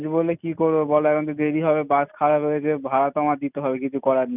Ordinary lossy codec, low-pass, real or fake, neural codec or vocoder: none; 3.6 kHz; real; none